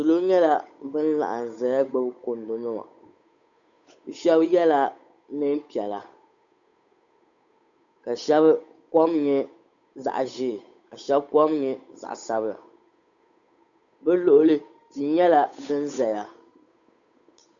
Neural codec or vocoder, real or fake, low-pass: codec, 16 kHz, 8 kbps, FunCodec, trained on Chinese and English, 25 frames a second; fake; 7.2 kHz